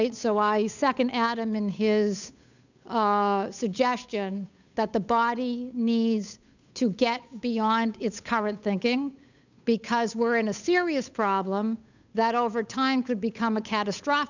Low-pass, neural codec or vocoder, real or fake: 7.2 kHz; codec, 16 kHz, 8 kbps, FunCodec, trained on Chinese and English, 25 frames a second; fake